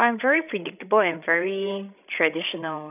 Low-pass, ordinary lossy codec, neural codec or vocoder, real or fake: 3.6 kHz; none; codec, 16 kHz, 8 kbps, FreqCodec, larger model; fake